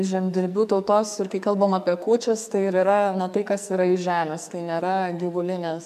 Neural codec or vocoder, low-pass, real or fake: codec, 44.1 kHz, 2.6 kbps, SNAC; 14.4 kHz; fake